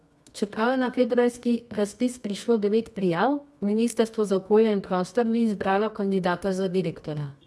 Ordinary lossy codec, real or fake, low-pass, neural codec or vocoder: none; fake; none; codec, 24 kHz, 0.9 kbps, WavTokenizer, medium music audio release